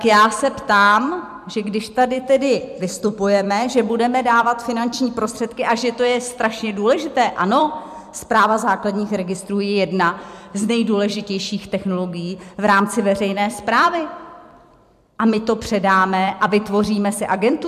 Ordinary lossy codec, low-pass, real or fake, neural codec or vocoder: MP3, 96 kbps; 14.4 kHz; fake; vocoder, 44.1 kHz, 128 mel bands every 256 samples, BigVGAN v2